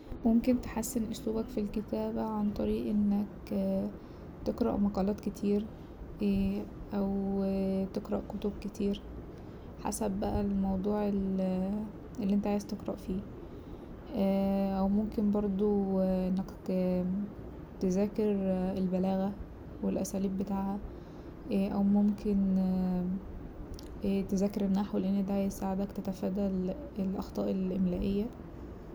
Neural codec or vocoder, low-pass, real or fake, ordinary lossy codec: none; none; real; none